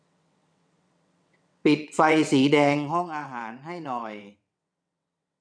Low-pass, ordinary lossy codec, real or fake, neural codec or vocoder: 9.9 kHz; none; fake; vocoder, 22.05 kHz, 80 mel bands, WaveNeXt